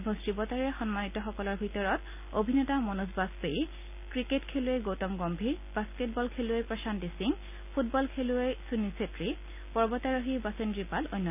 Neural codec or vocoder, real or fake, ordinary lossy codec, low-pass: none; real; none; 3.6 kHz